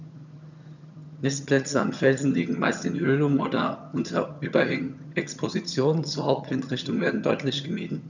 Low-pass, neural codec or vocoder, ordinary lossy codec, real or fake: 7.2 kHz; vocoder, 22.05 kHz, 80 mel bands, HiFi-GAN; none; fake